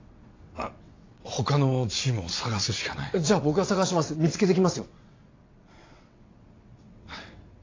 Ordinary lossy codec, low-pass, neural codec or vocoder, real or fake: AAC, 32 kbps; 7.2 kHz; vocoder, 22.05 kHz, 80 mel bands, WaveNeXt; fake